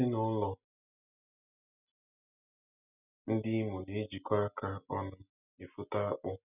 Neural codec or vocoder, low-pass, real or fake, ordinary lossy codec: none; 3.6 kHz; real; none